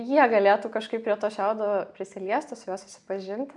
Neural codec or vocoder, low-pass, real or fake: none; 10.8 kHz; real